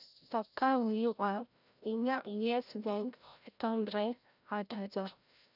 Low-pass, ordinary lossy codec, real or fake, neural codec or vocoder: 5.4 kHz; none; fake; codec, 16 kHz, 0.5 kbps, FreqCodec, larger model